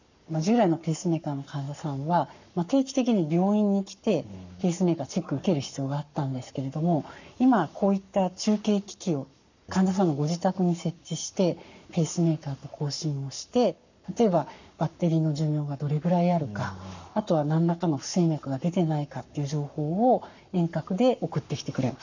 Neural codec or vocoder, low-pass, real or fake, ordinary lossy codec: codec, 44.1 kHz, 7.8 kbps, Pupu-Codec; 7.2 kHz; fake; none